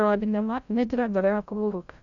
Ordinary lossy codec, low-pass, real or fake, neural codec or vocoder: none; 7.2 kHz; fake; codec, 16 kHz, 0.5 kbps, FreqCodec, larger model